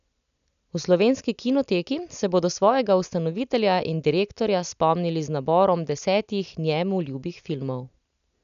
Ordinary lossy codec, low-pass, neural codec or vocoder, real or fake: none; 7.2 kHz; none; real